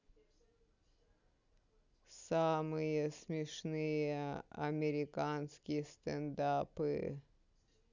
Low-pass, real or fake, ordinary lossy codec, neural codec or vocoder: 7.2 kHz; real; none; none